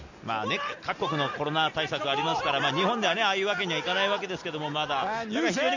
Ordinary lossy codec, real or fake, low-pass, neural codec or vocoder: none; real; 7.2 kHz; none